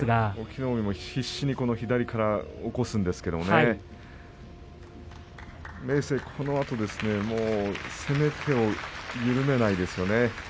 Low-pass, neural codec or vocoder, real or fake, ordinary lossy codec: none; none; real; none